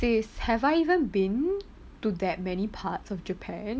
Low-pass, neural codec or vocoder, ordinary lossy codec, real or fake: none; none; none; real